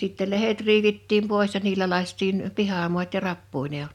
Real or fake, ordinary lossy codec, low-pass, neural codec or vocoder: real; none; 19.8 kHz; none